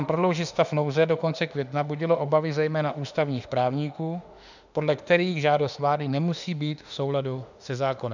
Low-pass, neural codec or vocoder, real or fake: 7.2 kHz; autoencoder, 48 kHz, 32 numbers a frame, DAC-VAE, trained on Japanese speech; fake